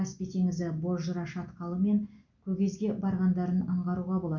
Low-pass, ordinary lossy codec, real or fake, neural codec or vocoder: 7.2 kHz; none; real; none